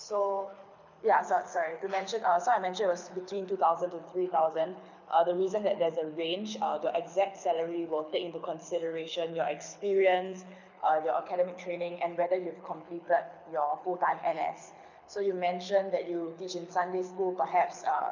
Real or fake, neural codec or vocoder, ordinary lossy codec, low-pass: fake; codec, 24 kHz, 6 kbps, HILCodec; none; 7.2 kHz